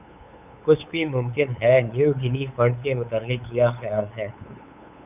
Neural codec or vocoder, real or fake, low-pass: codec, 16 kHz, 8 kbps, FunCodec, trained on LibriTTS, 25 frames a second; fake; 3.6 kHz